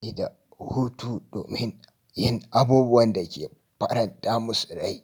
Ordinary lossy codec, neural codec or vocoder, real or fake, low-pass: none; none; real; none